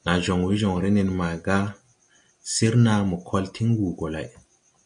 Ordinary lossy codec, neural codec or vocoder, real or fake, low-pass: MP3, 48 kbps; none; real; 9.9 kHz